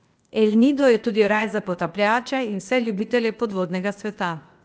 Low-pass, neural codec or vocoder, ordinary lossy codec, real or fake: none; codec, 16 kHz, 0.8 kbps, ZipCodec; none; fake